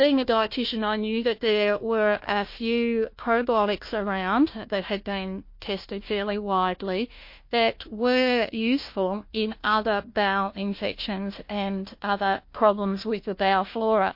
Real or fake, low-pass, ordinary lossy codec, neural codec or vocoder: fake; 5.4 kHz; MP3, 32 kbps; codec, 16 kHz, 1 kbps, FunCodec, trained on Chinese and English, 50 frames a second